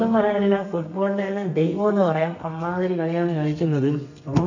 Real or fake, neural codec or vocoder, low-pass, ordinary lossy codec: fake; codec, 32 kHz, 1.9 kbps, SNAC; 7.2 kHz; none